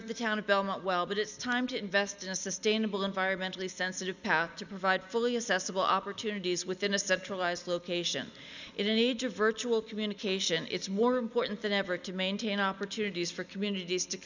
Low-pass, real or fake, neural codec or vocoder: 7.2 kHz; real; none